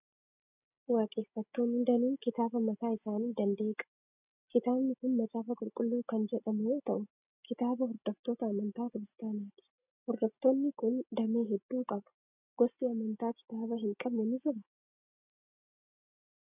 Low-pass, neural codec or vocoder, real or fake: 3.6 kHz; none; real